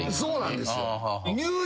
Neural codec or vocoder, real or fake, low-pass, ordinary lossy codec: none; real; none; none